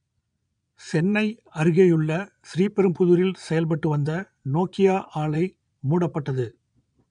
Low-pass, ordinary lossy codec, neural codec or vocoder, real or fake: 9.9 kHz; none; vocoder, 22.05 kHz, 80 mel bands, Vocos; fake